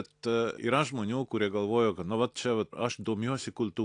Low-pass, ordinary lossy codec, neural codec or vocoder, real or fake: 9.9 kHz; AAC, 64 kbps; none; real